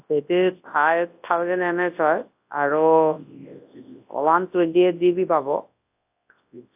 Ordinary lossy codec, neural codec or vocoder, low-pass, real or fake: AAC, 32 kbps; codec, 24 kHz, 0.9 kbps, WavTokenizer, large speech release; 3.6 kHz; fake